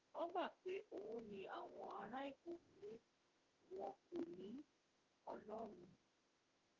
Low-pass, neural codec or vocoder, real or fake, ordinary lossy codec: 7.2 kHz; autoencoder, 48 kHz, 32 numbers a frame, DAC-VAE, trained on Japanese speech; fake; Opus, 16 kbps